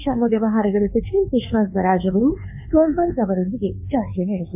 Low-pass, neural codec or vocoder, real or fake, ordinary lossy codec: 3.6 kHz; codec, 24 kHz, 1.2 kbps, DualCodec; fake; AAC, 32 kbps